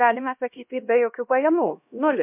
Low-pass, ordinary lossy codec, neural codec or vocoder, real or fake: 3.6 kHz; MP3, 32 kbps; codec, 16 kHz, 1 kbps, X-Codec, HuBERT features, trained on LibriSpeech; fake